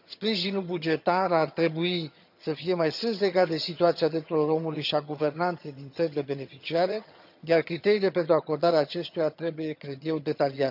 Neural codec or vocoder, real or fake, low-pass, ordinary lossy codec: vocoder, 22.05 kHz, 80 mel bands, HiFi-GAN; fake; 5.4 kHz; none